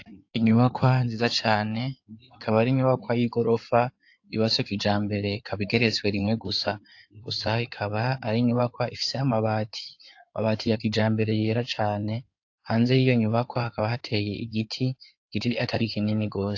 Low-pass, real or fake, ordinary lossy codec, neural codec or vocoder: 7.2 kHz; fake; AAC, 48 kbps; codec, 16 kHz in and 24 kHz out, 2.2 kbps, FireRedTTS-2 codec